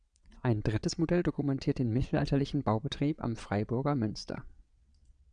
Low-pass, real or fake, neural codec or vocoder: 9.9 kHz; fake; vocoder, 22.05 kHz, 80 mel bands, WaveNeXt